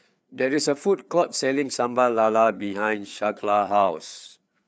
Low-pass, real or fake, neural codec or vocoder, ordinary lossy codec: none; fake; codec, 16 kHz, 4 kbps, FreqCodec, larger model; none